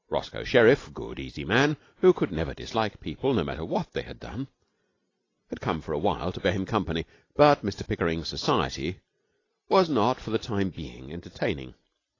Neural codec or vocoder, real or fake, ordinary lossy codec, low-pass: none; real; AAC, 32 kbps; 7.2 kHz